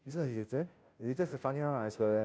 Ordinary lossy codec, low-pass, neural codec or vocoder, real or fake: none; none; codec, 16 kHz, 0.5 kbps, FunCodec, trained on Chinese and English, 25 frames a second; fake